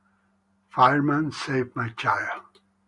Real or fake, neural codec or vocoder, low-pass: real; none; 10.8 kHz